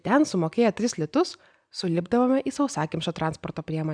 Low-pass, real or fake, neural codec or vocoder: 9.9 kHz; real; none